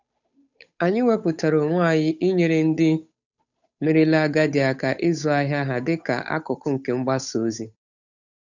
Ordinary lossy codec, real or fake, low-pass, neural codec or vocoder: none; fake; 7.2 kHz; codec, 16 kHz, 8 kbps, FunCodec, trained on Chinese and English, 25 frames a second